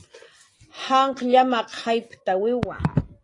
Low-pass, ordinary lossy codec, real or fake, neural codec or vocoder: 10.8 kHz; AAC, 32 kbps; real; none